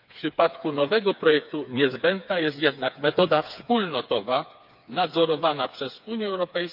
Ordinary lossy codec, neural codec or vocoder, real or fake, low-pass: none; codec, 16 kHz, 4 kbps, FreqCodec, smaller model; fake; 5.4 kHz